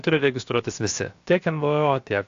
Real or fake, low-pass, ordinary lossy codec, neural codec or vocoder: fake; 7.2 kHz; AAC, 48 kbps; codec, 16 kHz, 0.7 kbps, FocalCodec